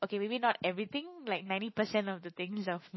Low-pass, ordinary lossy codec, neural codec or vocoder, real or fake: 7.2 kHz; MP3, 24 kbps; autoencoder, 48 kHz, 128 numbers a frame, DAC-VAE, trained on Japanese speech; fake